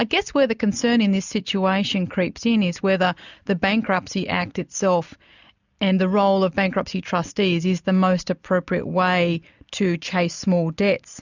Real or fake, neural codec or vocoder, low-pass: real; none; 7.2 kHz